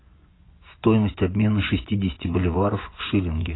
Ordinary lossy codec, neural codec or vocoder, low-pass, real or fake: AAC, 16 kbps; vocoder, 24 kHz, 100 mel bands, Vocos; 7.2 kHz; fake